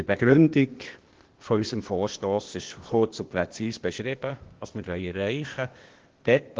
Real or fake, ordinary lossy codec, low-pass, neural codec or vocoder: fake; Opus, 16 kbps; 7.2 kHz; codec, 16 kHz, 0.8 kbps, ZipCodec